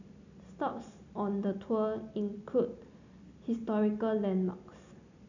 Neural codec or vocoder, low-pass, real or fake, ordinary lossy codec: none; 7.2 kHz; real; none